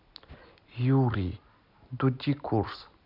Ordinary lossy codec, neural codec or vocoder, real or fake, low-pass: none; none; real; 5.4 kHz